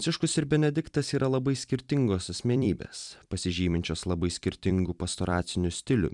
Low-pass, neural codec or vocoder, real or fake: 10.8 kHz; vocoder, 44.1 kHz, 128 mel bands every 256 samples, BigVGAN v2; fake